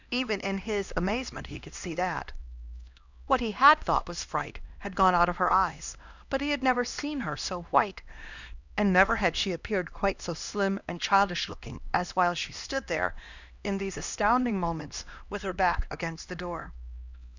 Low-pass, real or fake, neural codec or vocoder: 7.2 kHz; fake; codec, 16 kHz, 1 kbps, X-Codec, HuBERT features, trained on LibriSpeech